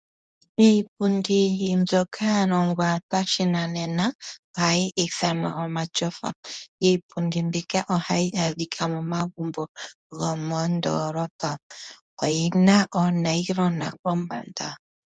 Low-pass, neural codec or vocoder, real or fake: 9.9 kHz; codec, 24 kHz, 0.9 kbps, WavTokenizer, medium speech release version 2; fake